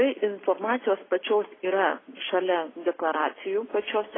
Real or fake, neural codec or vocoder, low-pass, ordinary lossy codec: real; none; 7.2 kHz; AAC, 16 kbps